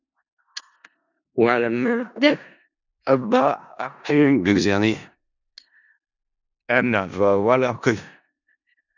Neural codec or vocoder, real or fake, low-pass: codec, 16 kHz in and 24 kHz out, 0.4 kbps, LongCat-Audio-Codec, four codebook decoder; fake; 7.2 kHz